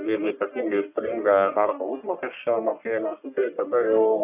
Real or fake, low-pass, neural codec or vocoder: fake; 3.6 kHz; codec, 44.1 kHz, 1.7 kbps, Pupu-Codec